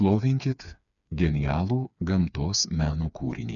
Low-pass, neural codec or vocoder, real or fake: 7.2 kHz; codec, 16 kHz, 4 kbps, FreqCodec, smaller model; fake